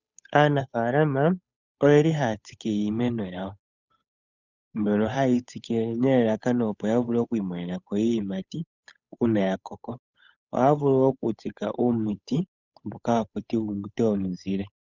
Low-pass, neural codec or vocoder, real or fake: 7.2 kHz; codec, 16 kHz, 8 kbps, FunCodec, trained on Chinese and English, 25 frames a second; fake